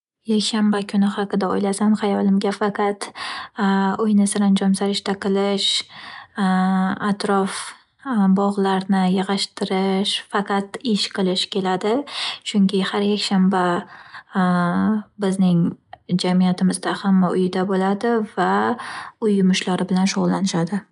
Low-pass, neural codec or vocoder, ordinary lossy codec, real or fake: 10.8 kHz; none; none; real